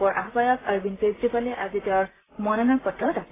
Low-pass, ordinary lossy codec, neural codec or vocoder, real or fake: 3.6 kHz; AAC, 16 kbps; vocoder, 44.1 kHz, 128 mel bands, Pupu-Vocoder; fake